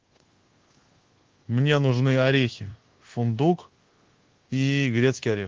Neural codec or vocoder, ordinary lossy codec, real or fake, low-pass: codec, 24 kHz, 1.2 kbps, DualCodec; Opus, 16 kbps; fake; 7.2 kHz